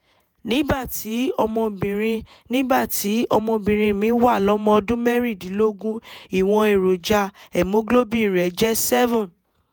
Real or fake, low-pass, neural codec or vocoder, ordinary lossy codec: fake; none; vocoder, 48 kHz, 128 mel bands, Vocos; none